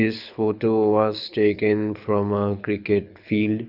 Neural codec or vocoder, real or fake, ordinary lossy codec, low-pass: codec, 24 kHz, 6 kbps, HILCodec; fake; none; 5.4 kHz